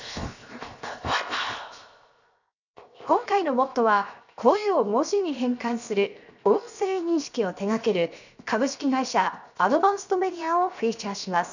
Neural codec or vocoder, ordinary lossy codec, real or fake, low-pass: codec, 16 kHz, 0.7 kbps, FocalCodec; none; fake; 7.2 kHz